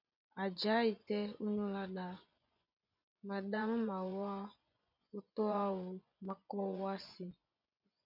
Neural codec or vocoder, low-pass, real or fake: vocoder, 24 kHz, 100 mel bands, Vocos; 5.4 kHz; fake